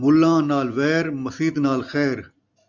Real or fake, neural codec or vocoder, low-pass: real; none; 7.2 kHz